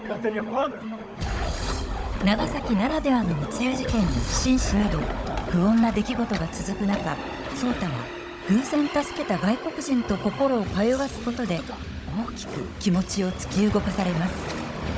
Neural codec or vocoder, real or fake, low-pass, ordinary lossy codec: codec, 16 kHz, 16 kbps, FunCodec, trained on Chinese and English, 50 frames a second; fake; none; none